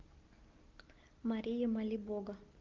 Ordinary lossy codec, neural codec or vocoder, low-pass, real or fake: Opus, 24 kbps; none; 7.2 kHz; real